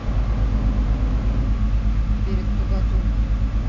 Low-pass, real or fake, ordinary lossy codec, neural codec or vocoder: 7.2 kHz; real; none; none